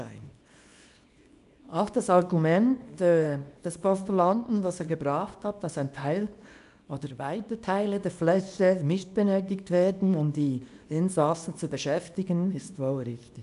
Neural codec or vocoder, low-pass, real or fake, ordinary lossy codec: codec, 24 kHz, 0.9 kbps, WavTokenizer, small release; 10.8 kHz; fake; none